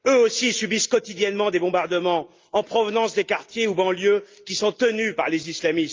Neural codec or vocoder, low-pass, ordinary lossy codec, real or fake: none; 7.2 kHz; Opus, 24 kbps; real